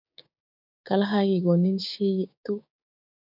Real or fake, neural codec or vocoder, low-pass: fake; codec, 16 kHz, 6 kbps, DAC; 5.4 kHz